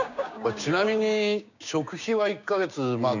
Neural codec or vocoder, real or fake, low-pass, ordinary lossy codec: codec, 16 kHz, 6 kbps, DAC; fake; 7.2 kHz; none